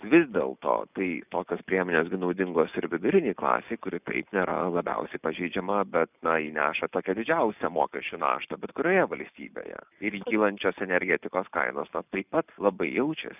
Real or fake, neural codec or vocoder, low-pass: real; none; 3.6 kHz